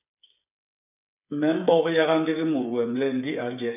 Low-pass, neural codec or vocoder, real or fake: 3.6 kHz; codec, 16 kHz, 8 kbps, FreqCodec, smaller model; fake